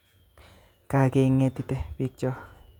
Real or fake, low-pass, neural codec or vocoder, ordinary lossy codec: fake; 19.8 kHz; vocoder, 48 kHz, 128 mel bands, Vocos; none